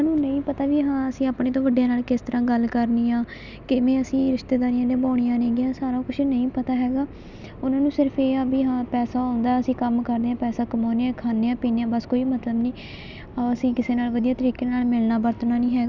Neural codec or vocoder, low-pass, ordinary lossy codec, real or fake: none; 7.2 kHz; none; real